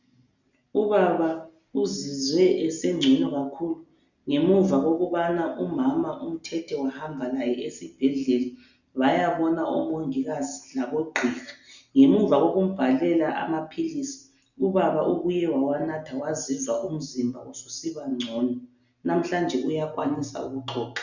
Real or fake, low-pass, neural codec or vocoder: real; 7.2 kHz; none